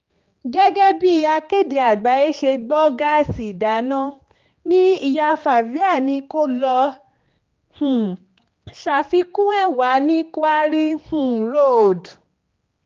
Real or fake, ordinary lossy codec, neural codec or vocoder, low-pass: fake; Opus, 32 kbps; codec, 16 kHz, 2 kbps, X-Codec, HuBERT features, trained on general audio; 7.2 kHz